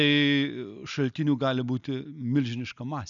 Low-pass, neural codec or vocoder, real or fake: 7.2 kHz; none; real